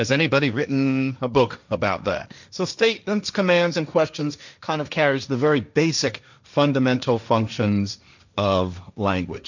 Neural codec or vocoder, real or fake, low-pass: codec, 16 kHz, 1.1 kbps, Voila-Tokenizer; fake; 7.2 kHz